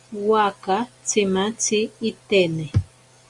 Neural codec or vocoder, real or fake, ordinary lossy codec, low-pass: none; real; Opus, 64 kbps; 10.8 kHz